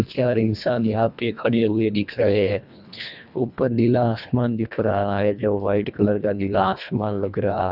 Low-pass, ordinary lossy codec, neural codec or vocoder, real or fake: 5.4 kHz; none; codec, 24 kHz, 1.5 kbps, HILCodec; fake